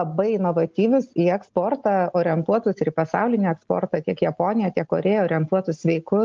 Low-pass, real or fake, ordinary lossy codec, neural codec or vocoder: 7.2 kHz; real; Opus, 24 kbps; none